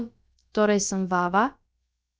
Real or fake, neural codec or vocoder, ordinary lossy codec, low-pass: fake; codec, 16 kHz, about 1 kbps, DyCAST, with the encoder's durations; none; none